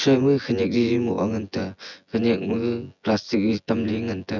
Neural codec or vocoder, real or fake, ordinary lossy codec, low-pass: vocoder, 24 kHz, 100 mel bands, Vocos; fake; none; 7.2 kHz